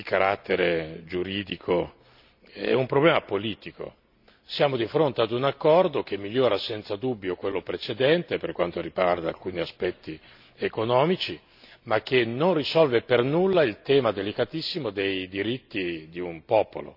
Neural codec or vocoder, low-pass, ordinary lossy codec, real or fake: none; 5.4 kHz; none; real